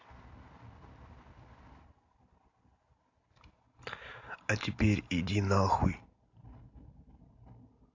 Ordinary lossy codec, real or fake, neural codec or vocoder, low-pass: AAC, 48 kbps; real; none; 7.2 kHz